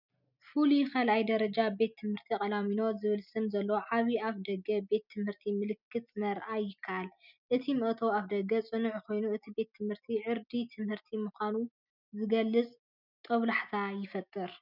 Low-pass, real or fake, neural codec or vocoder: 5.4 kHz; real; none